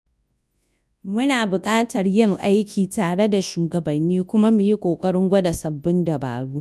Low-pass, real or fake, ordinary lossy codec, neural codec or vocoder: none; fake; none; codec, 24 kHz, 0.9 kbps, WavTokenizer, large speech release